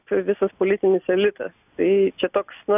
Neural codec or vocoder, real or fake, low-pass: none; real; 3.6 kHz